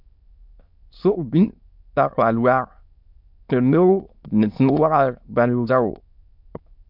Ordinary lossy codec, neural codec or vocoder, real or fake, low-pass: MP3, 48 kbps; autoencoder, 22.05 kHz, a latent of 192 numbers a frame, VITS, trained on many speakers; fake; 5.4 kHz